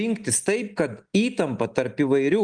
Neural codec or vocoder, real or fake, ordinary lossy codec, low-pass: none; real; Opus, 32 kbps; 9.9 kHz